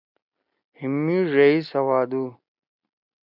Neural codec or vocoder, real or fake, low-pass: none; real; 5.4 kHz